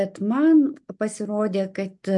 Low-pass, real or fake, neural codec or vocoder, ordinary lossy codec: 10.8 kHz; real; none; MP3, 64 kbps